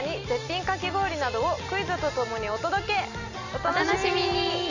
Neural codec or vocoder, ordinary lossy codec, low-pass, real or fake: none; none; 7.2 kHz; real